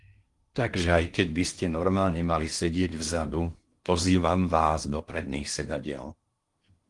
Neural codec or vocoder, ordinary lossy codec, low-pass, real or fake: codec, 16 kHz in and 24 kHz out, 0.8 kbps, FocalCodec, streaming, 65536 codes; Opus, 24 kbps; 10.8 kHz; fake